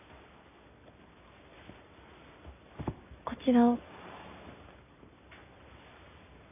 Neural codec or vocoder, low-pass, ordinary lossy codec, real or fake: none; 3.6 kHz; none; real